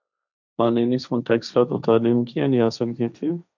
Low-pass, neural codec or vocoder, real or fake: 7.2 kHz; codec, 16 kHz, 1.1 kbps, Voila-Tokenizer; fake